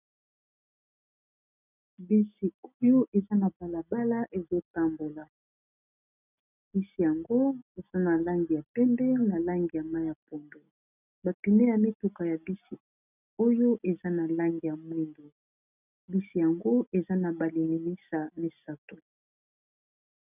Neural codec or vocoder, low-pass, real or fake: none; 3.6 kHz; real